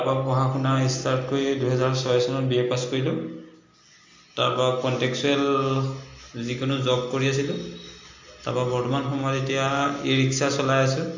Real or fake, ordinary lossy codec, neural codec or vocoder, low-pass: real; MP3, 64 kbps; none; 7.2 kHz